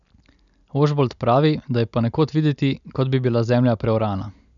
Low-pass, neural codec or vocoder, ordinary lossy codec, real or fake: 7.2 kHz; none; none; real